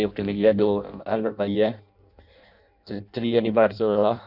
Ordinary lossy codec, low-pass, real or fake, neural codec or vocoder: none; 5.4 kHz; fake; codec, 16 kHz in and 24 kHz out, 0.6 kbps, FireRedTTS-2 codec